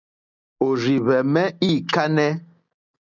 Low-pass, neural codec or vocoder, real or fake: 7.2 kHz; none; real